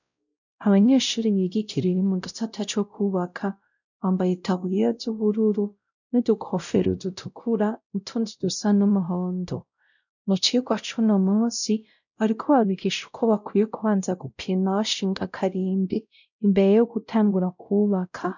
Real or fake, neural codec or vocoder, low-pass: fake; codec, 16 kHz, 0.5 kbps, X-Codec, WavLM features, trained on Multilingual LibriSpeech; 7.2 kHz